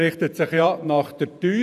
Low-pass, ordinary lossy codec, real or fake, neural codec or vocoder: 14.4 kHz; none; fake; vocoder, 48 kHz, 128 mel bands, Vocos